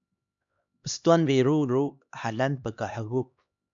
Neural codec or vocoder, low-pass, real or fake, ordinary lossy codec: codec, 16 kHz, 2 kbps, X-Codec, HuBERT features, trained on LibriSpeech; 7.2 kHz; fake; MP3, 64 kbps